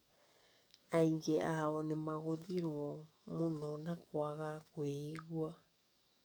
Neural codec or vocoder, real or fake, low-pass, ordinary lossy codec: codec, 44.1 kHz, 7.8 kbps, DAC; fake; none; none